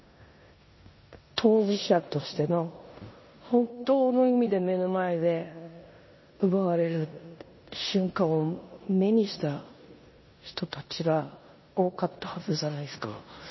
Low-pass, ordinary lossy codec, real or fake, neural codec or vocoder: 7.2 kHz; MP3, 24 kbps; fake; codec, 16 kHz in and 24 kHz out, 0.9 kbps, LongCat-Audio-Codec, four codebook decoder